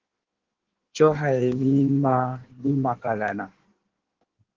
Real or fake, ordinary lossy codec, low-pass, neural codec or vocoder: fake; Opus, 16 kbps; 7.2 kHz; codec, 16 kHz in and 24 kHz out, 1.1 kbps, FireRedTTS-2 codec